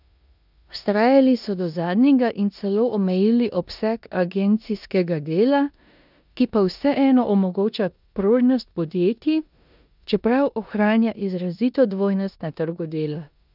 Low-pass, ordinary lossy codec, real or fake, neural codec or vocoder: 5.4 kHz; none; fake; codec, 16 kHz in and 24 kHz out, 0.9 kbps, LongCat-Audio-Codec, four codebook decoder